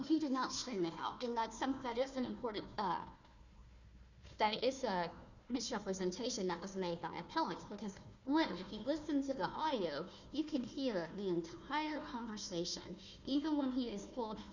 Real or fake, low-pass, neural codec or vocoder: fake; 7.2 kHz; codec, 16 kHz, 1 kbps, FunCodec, trained on Chinese and English, 50 frames a second